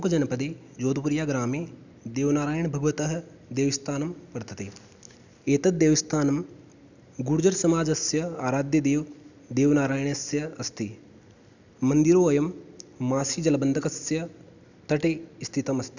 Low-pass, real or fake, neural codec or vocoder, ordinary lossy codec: 7.2 kHz; real; none; none